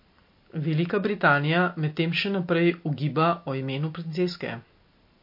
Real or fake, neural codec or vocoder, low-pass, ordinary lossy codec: real; none; 5.4 kHz; MP3, 32 kbps